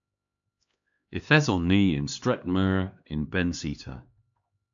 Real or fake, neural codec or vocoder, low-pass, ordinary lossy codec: fake; codec, 16 kHz, 2 kbps, X-Codec, HuBERT features, trained on LibriSpeech; 7.2 kHz; AAC, 64 kbps